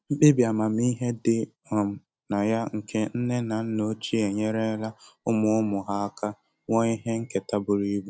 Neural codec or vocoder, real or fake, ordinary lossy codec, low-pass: none; real; none; none